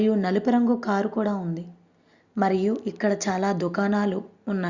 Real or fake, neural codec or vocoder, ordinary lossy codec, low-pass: real; none; Opus, 64 kbps; 7.2 kHz